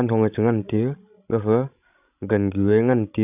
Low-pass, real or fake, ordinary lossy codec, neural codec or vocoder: 3.6 kHz; fake; none; codec, 16 kHz, 16 kbps, FreqCodec, larger model